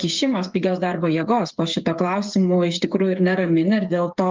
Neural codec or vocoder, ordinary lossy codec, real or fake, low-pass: codec, 16 kHz, 8 kbps, FreqCodec, smaller model; Opus, 32 kbps; fake; 7.2 kHz